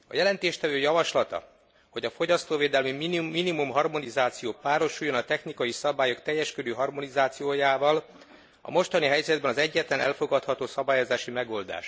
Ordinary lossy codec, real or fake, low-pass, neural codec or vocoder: none; real; none; none